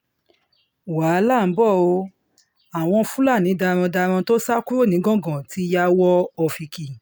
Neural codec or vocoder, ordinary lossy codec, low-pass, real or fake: none; none; none; real